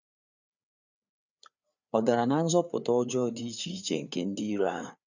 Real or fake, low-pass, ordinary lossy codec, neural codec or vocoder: fake; 7.2 kHz; none; codec, 16 kHz, 4 kbps, FreqCodec, larger model